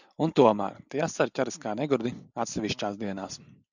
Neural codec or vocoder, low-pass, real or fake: none; 7.2 kHz; real